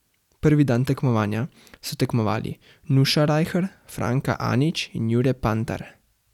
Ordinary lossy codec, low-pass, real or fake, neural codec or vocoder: none; 19.8 kHz; real; none